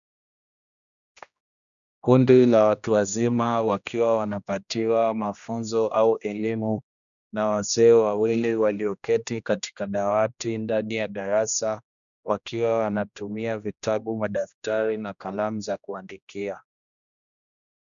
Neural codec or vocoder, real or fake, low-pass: codec, 16 kHz, 1 kbps, X-Codec, HuBERT features, trained on general audio; fake; 7.2 kHz